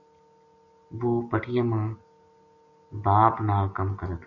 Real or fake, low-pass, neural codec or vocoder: real; 7.2 kHz; none